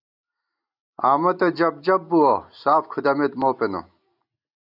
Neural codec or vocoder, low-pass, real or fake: none; 5.4 kHz; real